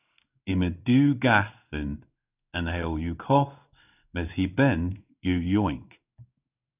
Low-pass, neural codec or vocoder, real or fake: 3.6 kHz; codec, 16 kHz in and 24 kHz out, 1 kbps, XY-Tokenizer; fake